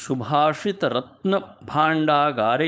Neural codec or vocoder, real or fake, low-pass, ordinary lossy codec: codec, 16 kHz, 4.8 kbps, FACodec; fake; none; none